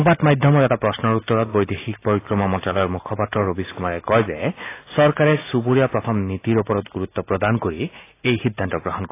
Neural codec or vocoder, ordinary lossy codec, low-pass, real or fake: none; AAC, 24 kbps; 3.6 kHz; real